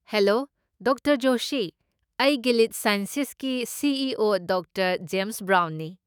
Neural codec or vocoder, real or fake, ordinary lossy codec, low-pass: autoencoder, 48 kHz, 128 numbers a frame, DAC-VAE, trained on Japanese speech; fake; none; none